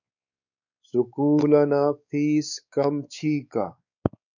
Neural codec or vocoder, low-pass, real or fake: codec, 16 kHz, 4 kbps, X-Codec, WavLM features, trained on Multilingual LibriSpeech; 7.2 kHz; fake